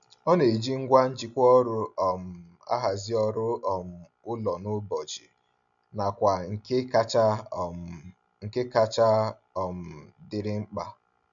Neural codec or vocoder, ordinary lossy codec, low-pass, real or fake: none; none; 7.2 kHz; real